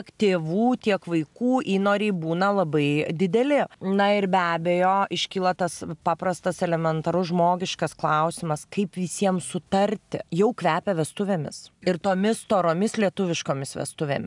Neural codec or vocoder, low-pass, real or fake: none; 10.8 kHz; real